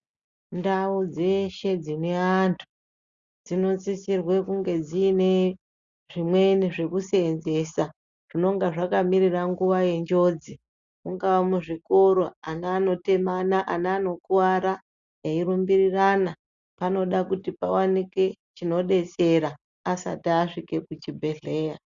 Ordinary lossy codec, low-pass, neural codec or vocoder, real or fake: Opus, 64 kbps; 7.2 kHz; none; real